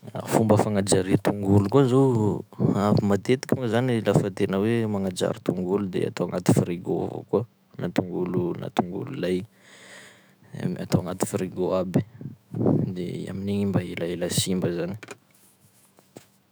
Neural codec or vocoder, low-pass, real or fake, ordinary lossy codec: autoencoder, 48 kHz, 128 numbers a frame, DAC-VAE, trained on Japanese speech; none; fake; none